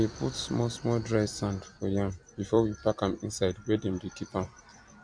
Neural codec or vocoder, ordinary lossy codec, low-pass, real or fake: vocoder, 44.1 kHz, 128 mel bands every 256 samples, BigVGAN v2; MP3, 64 kbps; 9.9 kHz; fake